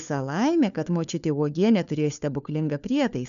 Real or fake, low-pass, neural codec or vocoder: fake; 7.2 kHz; codec, 16 kHz, 4 kbps, FunCodec, trained on LibriTTS, 50 frames a second